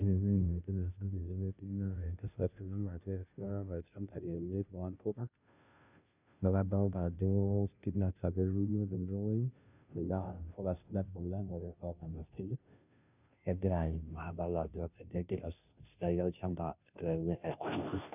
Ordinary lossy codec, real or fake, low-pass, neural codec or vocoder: none; fake; 3.6 kHz; codec, 16 kHz, 0.5 kbps, FunCodec, trained on Chinese and English, 25 frames a second